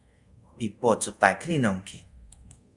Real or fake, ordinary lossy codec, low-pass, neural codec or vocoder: fake; Opus, 64 kbps; 10.8 kHz; codec, 24 kHz, 0.5 kbps, DualCodec